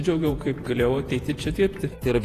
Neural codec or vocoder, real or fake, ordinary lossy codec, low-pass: vocoder, 48 kHz, 128 mel bands, Vocos; fake; AAC, 48 kbps; 14.4 kHz